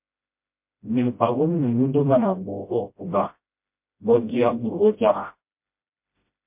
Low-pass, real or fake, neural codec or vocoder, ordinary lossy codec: 3.6 kHz; fake; codec, 16 kHz, 0.5 kbps, FreqCodec, smaller model; MP3, 32 kbps